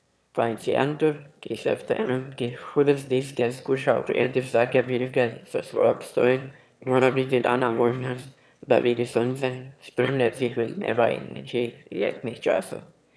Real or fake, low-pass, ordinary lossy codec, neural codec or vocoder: fake; none; none; autoencoder, 22.05 kHz, a latent of 192 numbers a frame, VITS, trained on one speaker